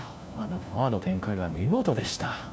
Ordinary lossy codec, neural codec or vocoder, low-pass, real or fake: none; codec, 16 kHz, 1 kbps, FunCodec, trained on LibriTTS, 50 frames a second; none; fake